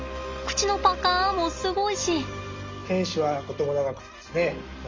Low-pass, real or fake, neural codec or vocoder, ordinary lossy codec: 7.2 kHz; real; none; Opus, 32 kbps